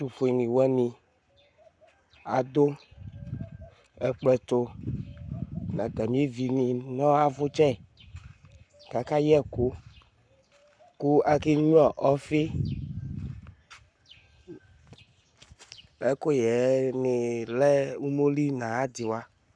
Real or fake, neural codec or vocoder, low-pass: fake; codec, 44.1 kHz, 7.8 kbps, Pupu-Codec; 9.9 kHz